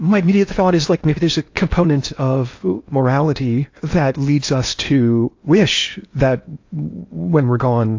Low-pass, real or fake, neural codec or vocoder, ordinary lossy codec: 7.2 kHz; fake; codec, 16 kHz in and 24 kHz out, 0.8 kbps, FocalCodec, streaming, 65536 codes; AAC, 48 kbps